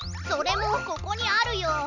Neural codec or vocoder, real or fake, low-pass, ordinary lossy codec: none; real; 7.2 kHz; none